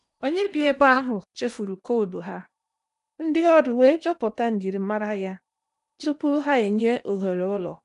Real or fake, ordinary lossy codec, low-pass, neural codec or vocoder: fake; none; 10.8 kHz; codec, 16 kHz in and 24 kHz out, 0.8 kbps, FocalCodec, streaming, 65536 codes